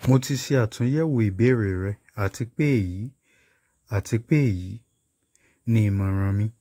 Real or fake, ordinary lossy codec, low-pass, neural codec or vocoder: fake; AAC, 48 kbps; 19.8 kHz; autoencoder, 48 kHz, 128 numbers a frame, DAC-VAE, trained on Japanese speech